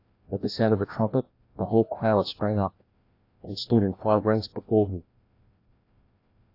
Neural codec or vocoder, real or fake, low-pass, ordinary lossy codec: codec, 16 kHz, 1 kbps, FreqCodec, larger model; fake; 5.4 kHz; AAC, 32 kbps